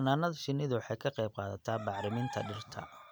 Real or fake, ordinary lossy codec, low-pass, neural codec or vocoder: real; none; none; none